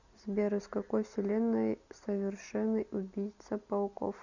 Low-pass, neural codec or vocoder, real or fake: 7.2 kHz; none; real